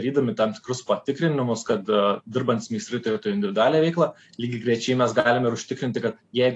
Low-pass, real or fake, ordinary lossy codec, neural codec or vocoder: 10.8 kHz; real; AAC, 48 kbps; none